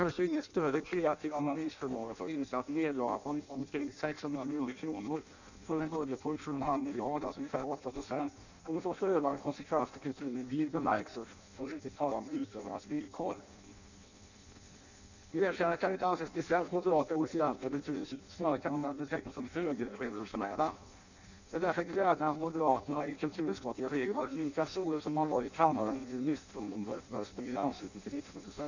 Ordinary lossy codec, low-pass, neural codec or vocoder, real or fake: none; 7.2 kHz; codec, 16 kHz in and 24 kHz out, 0.6 kbps, FireRedTTS-2 codec; fake